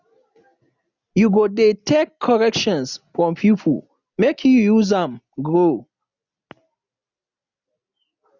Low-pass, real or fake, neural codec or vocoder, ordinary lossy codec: 7.2 kHz; real; none; none